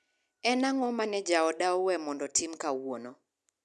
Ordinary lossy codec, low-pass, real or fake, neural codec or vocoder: none; none; real; none